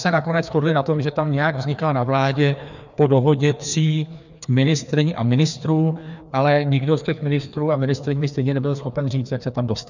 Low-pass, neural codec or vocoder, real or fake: 7.2 kHz; codec, 16 kHz, 2 kbps, FreqCodec, larger model; fake